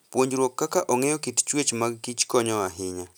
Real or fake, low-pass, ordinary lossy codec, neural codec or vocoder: real; none; none; none